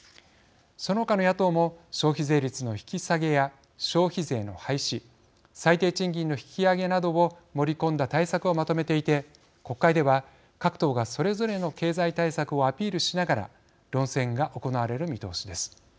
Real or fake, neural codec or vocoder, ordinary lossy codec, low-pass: real; none; none; none